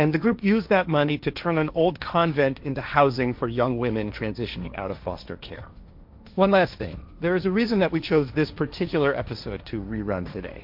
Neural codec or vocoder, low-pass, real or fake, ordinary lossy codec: codec, 16 kHz, 1.1 kbps, Voila-Tokenizer; 5.4 kHz; fake; MP3, 48 kbps